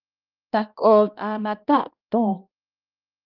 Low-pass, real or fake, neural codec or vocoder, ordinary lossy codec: 5.4 kHz; fake; codec, 16 kHz, 1 kbps, X-Codec, HuBERT features, trained on balanced general audio; Opus, 24 kbps